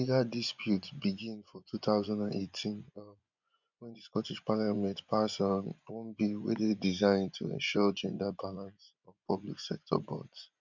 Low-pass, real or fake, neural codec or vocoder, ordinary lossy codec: 7.2 kHz; fake; vocoder, 24 kHz, 100 mel bands, Vocos; none